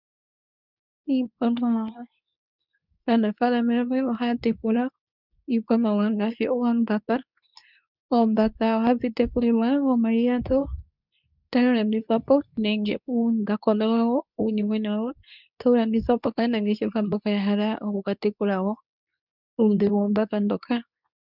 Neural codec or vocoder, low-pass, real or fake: codec, 24 kHz, 0.9 kbps, WavTokenizer, medium speech release version 2; 5.4 kHz; fake